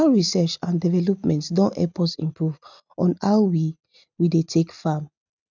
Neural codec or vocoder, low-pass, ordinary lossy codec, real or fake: none; 7.2 kHz; none; real